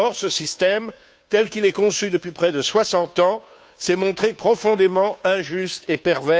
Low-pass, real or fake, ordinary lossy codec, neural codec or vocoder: none; fake; none; codec, 16 kHz, 2 kbps, FunCodec, trained on Chinese and English, 25 frames a second